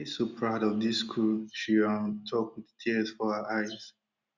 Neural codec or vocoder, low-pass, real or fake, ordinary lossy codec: none; 7.2 kHz; real; Opus, 64 kbps